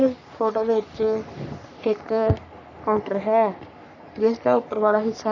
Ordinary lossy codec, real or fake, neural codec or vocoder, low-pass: none; fake; codec, 44.1 kHz, 3.4 kbps, Pupu-Codec; 7.2 kHz